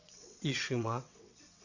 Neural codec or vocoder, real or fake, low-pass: vocoder, 22.05 kHz, 80 mel bands, WaveNeXt; fake; 7.2 kHz